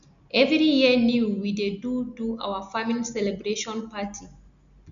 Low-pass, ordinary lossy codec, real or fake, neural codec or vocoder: 7.2 kHz; none; real; none